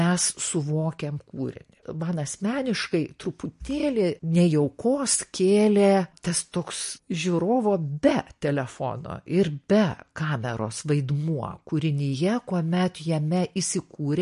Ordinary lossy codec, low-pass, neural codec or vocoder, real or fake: MP3, 48 kbps; 10.8 kHz; none; real